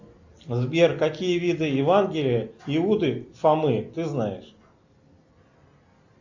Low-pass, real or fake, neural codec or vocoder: 7.2 kHz; real; none